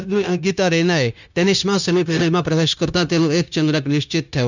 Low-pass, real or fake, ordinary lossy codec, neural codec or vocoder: 7.2 kHz; fake; none; codec, 16 kHz, 0.9 kbps, LongCat-Audio-Codec